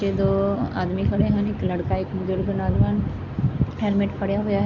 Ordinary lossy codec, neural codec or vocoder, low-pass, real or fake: none; none; 7.2 kHz; real